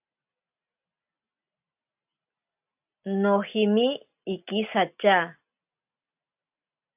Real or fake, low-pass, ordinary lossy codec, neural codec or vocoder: real; 3.6 kHz; AAC, 32 kbps; none